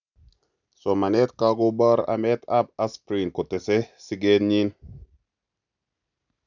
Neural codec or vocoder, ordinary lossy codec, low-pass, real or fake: none; none; 7.2 kHz; real